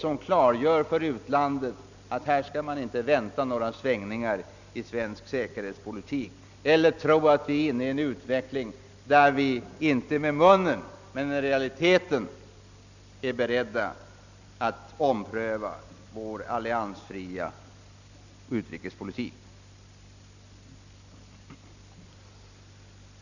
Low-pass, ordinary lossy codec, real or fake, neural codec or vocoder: 7.2 kHz; none; real; none